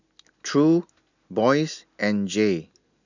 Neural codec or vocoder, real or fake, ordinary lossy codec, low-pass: none; real; none; 7.2 kHz